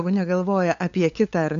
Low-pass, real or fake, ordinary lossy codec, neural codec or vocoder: 7.2 kHz; fake; AAC, 48 kbps; codec, 16 kHz, 8 kbps, FunCodec, trained on LibriTTS, 25 frames a second